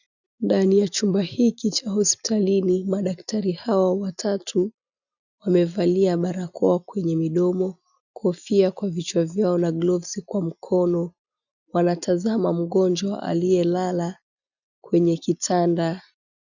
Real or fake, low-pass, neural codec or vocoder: real; 7.2 kHz; none